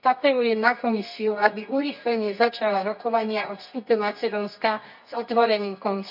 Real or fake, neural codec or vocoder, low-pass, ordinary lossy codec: fake; codec, 24 kHz, 0.9 kbps, WavTokenizer, medium music audio release; 5.4 kHz; none